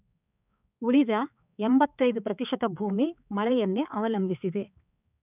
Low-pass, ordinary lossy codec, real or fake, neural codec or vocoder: 3.6 kHz; none; fake; codec, 16 kHz, 4 kbps, X-Codec, HuBERT features, trained on balanced general audio